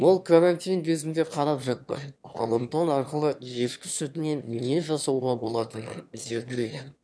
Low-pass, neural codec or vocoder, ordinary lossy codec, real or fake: none; autoencoder, 22.05 kHz, a latent of 192 numbers a frame, VITS, trained on one speaker; none; fake